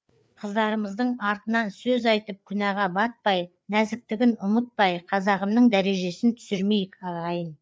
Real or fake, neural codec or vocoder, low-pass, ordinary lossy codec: fake; codec, 16 kHz, 4 kbps, FreqCodec, larger model; none; none